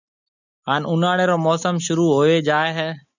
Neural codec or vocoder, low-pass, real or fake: none; 7.2 kHz; real